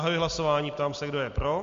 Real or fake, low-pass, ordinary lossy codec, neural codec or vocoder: real; 7.2 kHz; MP3, 48 kbps; none